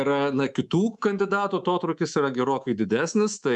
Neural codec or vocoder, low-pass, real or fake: codec, 24 kHz, 3.1 kbps, DualCodec; 10.8 kHz; fake